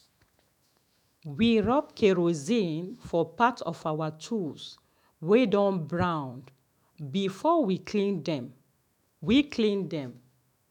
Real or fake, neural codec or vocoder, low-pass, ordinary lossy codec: fake; autoencoder, 48 kHz, 128 numbers a frame, DAC-VAE, trained on Japanese speech; 19.8 kHz; none